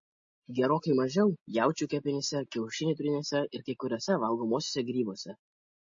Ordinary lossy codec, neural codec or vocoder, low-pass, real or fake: MP3, 32 kbps; none; 7.2 kHz; real